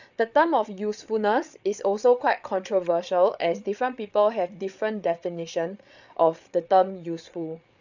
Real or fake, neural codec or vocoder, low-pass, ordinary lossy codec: fake; codec, 16 kHz, 8 kbps, FreqCodec, larger model; 7.2 kHz; none